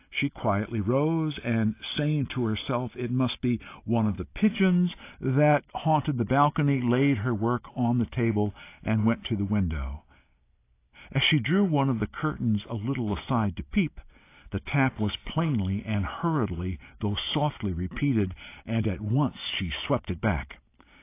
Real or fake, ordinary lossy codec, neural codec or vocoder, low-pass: real; AAC, 24 kbps; none; 3.6 kHz